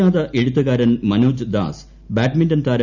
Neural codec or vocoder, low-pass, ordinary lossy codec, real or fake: none; 7.2 kHz; none; real